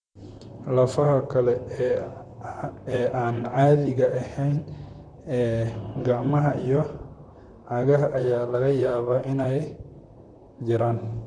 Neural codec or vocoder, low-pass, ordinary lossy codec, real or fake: vocoder, 44.1 kHz, 128 mel bands, Pupu-Vocoder; 9.9 kHz; none; fake